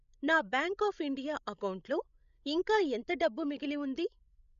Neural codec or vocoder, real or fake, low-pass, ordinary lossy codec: codec, 16 kHz, 16 kbps, FreqCodec, larger model; fake; 7.2 kHz; none